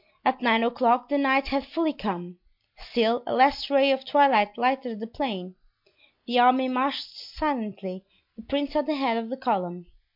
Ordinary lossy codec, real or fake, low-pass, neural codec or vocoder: MP3, 48 kbps; real; 5.4 kHz; none